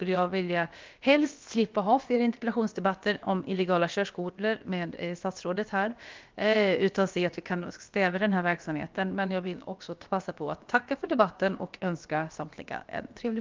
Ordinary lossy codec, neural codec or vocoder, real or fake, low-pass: Opus, 24 kbps; codec, 16 kHz, about 1 kbps, DyCAST, with the encoder's durations; fake; 7.2 kHz